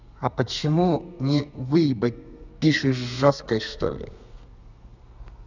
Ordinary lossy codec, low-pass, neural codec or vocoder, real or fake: none; 7.2 kHz; codec, 32 kHz, 1.9 kbps, SNAC; fake